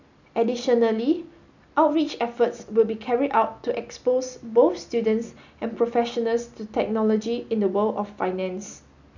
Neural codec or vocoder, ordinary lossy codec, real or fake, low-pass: none; none; real; 7.2 kHz